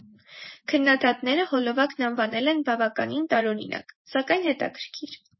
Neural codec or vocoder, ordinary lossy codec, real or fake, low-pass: vocoder, 24 kHz, 100 mel bands, Vocos; MP3, 24 kbps; fake; 7.2 kHz